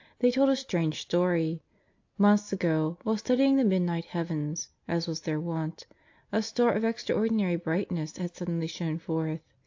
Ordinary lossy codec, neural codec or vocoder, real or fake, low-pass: AAC, 48 kbps; none; real; 7.2 kHz